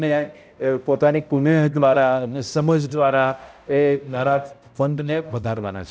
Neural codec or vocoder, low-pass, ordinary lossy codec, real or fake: codec, 16 kHz, 0.5 kbps, X-Codec, HuBERT features, trained on balanced general audio; none; none; fake